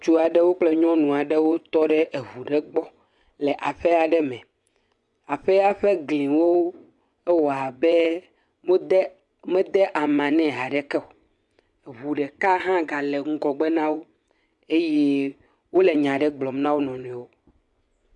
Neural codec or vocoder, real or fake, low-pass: vocoder, 24 kHz, 100 mel bands, Vocos; fake; 10.8 kHz